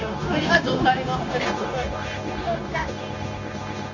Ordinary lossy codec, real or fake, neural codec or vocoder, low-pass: none; fake; codec, 16 kHz, 0.9 kbps, LongCat-Audio-Codec; 7.2 kHz